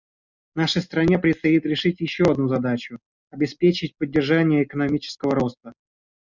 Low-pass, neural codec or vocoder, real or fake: 7.2 kHz; none; real